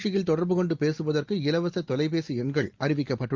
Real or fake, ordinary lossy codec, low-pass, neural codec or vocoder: real; Opus, 32 kbps; 7.2 kHz; none